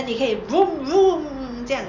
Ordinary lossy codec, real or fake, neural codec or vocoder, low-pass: none; real; none; 7.2 kHz